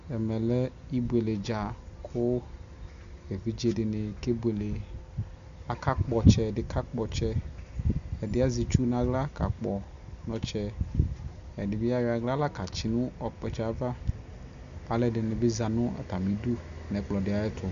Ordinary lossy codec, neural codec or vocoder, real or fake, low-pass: Opus, 64 kbps; none; real; 7.2 kHz